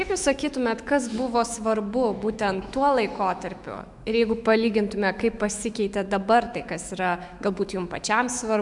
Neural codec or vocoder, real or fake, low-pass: autoencoder, 48 kHz, 128 numbers a frame, DAC-VAE, trained on Japanese speech; fake; 10.8 kHz